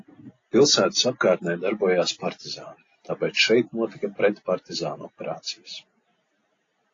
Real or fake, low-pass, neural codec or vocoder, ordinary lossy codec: real; 7.2 kHz; none; AAC, 32 kbps